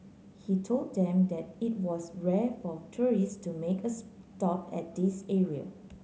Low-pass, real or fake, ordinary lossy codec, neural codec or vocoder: none; real; none; none